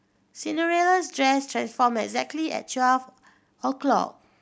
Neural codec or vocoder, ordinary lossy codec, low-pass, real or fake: none; none; none; real